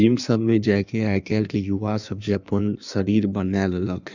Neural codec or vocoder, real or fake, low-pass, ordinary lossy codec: codec, 16 kHz in and 24 kHz out, 1.1 kbps, FireRedTTS-2 codec; fake; 7.2 kHz; none